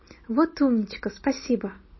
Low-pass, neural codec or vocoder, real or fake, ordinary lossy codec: 7.2 kHz; none; real; MP3, 24 kbps